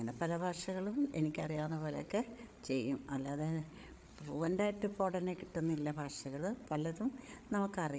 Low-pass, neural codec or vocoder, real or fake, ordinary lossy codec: none; codec, 16 kHz, 8 kbps, FreqCodec, larger model; fake; none